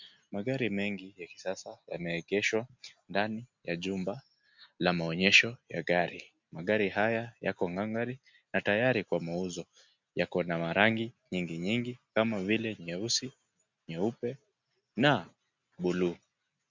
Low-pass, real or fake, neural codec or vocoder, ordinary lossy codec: 7.2 kHz; real; none; MP3, 64 kbps